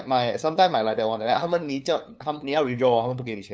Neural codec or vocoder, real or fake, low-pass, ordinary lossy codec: codec, 16 kHz, 2 kbps, FunCodec, trained on LibriTTS, 25 frames a second; fake; none; none